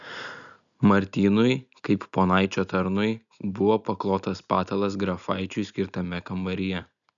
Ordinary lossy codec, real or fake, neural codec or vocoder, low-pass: MP3, 96 kbps; real; none; 7.2 kHz